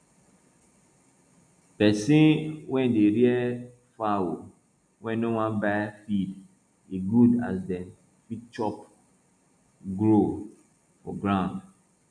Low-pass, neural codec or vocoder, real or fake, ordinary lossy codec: 9.9 kHz; none; real; none